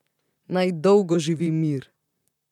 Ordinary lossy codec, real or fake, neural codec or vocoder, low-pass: none; fake; vocoder, 44.1 kHz, 128 mel bands, Pupu-Vocoder; 19.8 kHz